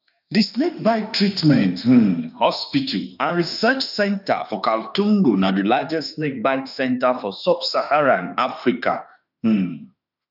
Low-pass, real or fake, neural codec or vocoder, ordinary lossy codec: 5.4 kHz; fake; autoencoder, 48 kHz, 32 numbers a frame, DAC-VAE, trained on Japanese speech; none